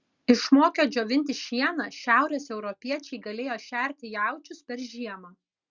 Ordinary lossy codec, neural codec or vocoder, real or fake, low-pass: Opus, 64 kbps; none; real; 7.2 kHz